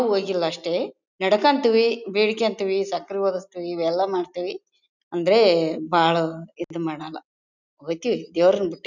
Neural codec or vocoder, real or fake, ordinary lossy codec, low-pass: none; real; none; 7.2 kHz